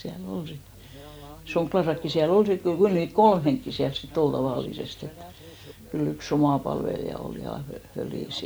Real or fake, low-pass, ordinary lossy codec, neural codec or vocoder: real; none; none; none